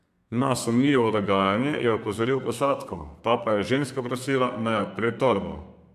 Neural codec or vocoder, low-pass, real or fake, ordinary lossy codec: codec, 32 kHz, 1.9 kbps, SNAC; 14.4 kHz; fake; AAC, 96 kbps